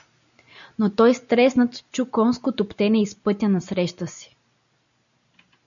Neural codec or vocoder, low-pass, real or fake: none; 7.2 kHz; real